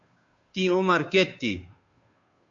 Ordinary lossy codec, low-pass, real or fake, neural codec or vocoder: MP3, 64 kbps; 7.2 kHz; fake; codec, 16 kHz, 2 kbps, FunCodec, trained on Chinese and English, 25 frames a second